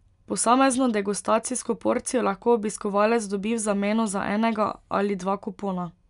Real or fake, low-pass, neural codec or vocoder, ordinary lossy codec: real; 10.8 kHz; none; none